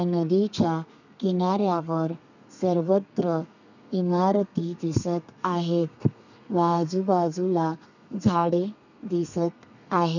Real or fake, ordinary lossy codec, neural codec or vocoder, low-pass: fake; none; codec, 32 kHz, 1.9 kbps, SNAC; 7.2 kHz